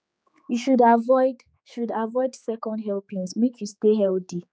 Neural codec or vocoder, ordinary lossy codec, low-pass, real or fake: codec, 16 kHz, 4 kbps, X-Codec, HuBERT features, trained on general audio; none; none; fake